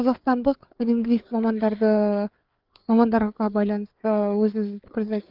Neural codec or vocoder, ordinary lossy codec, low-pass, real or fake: codec, 16 kHz, 4 kbps, FreqCodec, larger model; Opus, 32 kbps; 5.4 kHz; fake